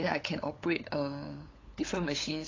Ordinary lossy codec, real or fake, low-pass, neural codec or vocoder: AAC, 32 kbps; fake; 7.2 kHz; codec, 16 kHz, 8 kbps, FunCodec, trained on LibriTTS, 25 frames a second